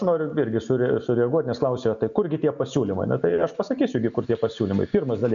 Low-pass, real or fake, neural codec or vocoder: 7.2 kHz; real; none